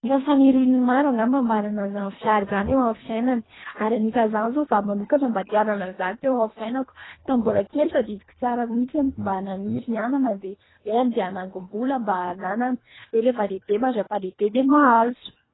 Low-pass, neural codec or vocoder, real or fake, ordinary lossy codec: 7.2 kHz; codec, 24 kHz, 1.5 kbps, HILCodec; fake; AAC, 16 kbps